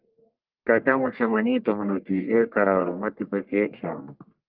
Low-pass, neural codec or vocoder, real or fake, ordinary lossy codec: 5.4 kHz; codec, 44.1 kHz, 1.7 kbps, Pupu-Codec; fake; Opus, 24 kbps